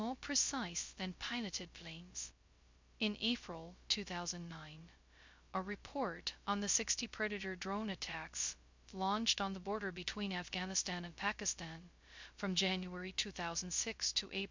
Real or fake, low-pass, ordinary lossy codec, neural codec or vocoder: fake; 7.2 kHz; MP3, 64 kbps; codec, 16 kHz, 0.2 kbps, FocalCodec